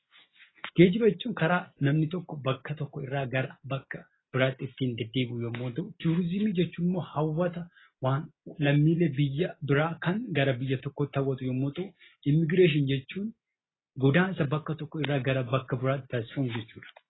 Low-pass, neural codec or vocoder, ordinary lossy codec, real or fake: 7.2 kHz; none; AAC, 16 kbps; real